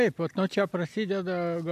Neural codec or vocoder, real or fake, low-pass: none; real; 14.4 kHz